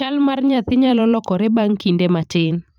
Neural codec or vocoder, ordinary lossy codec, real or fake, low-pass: vocoder, 44.1 kHz, 128 mel bands every 512 samples, BigVGAN v2; none; fake; 19.8 kHz